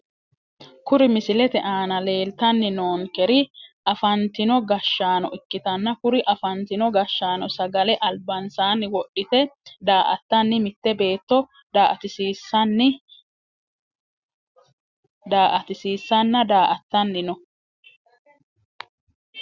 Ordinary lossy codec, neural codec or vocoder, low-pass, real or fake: Opus, 64 kbps; none; 7.2 kHz; real